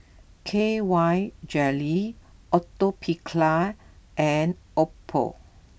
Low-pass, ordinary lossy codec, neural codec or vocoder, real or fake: none; none; none; real